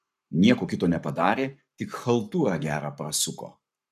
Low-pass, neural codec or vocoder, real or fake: 14.4 kHz; codec, 44.1 kHz, 7.8 kbps, Pupu-Codec; fake